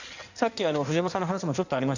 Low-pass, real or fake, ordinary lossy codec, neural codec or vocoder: 7.2 kHz; fake; none; codec, 16 kHz in and 24 kHz out, 1.1 kbps, FireRedTTS-2 codec